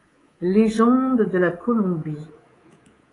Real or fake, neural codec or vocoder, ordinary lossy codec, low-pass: fake; codec, 24 kHz, 3.1 kbps, DualCodec; AAC, 32 kbps; 10.8 kHz